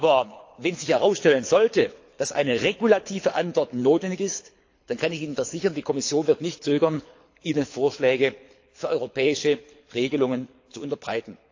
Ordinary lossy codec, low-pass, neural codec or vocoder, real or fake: AAC, 48 kbps; 7.2 kHz; codec, 24 kHz, 6 kbps, HILCodec; fake